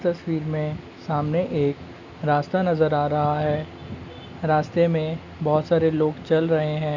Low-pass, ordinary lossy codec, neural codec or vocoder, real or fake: 7.2 kHz; none; none; real